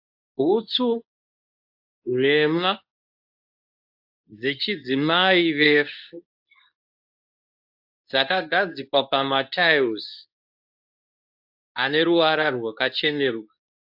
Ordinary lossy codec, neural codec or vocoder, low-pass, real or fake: AAC, 48 kbps; codec, 24 kHz, 0.9 kbps, WavTokenizer, medium speech release version 2; 5.4 kHz; fake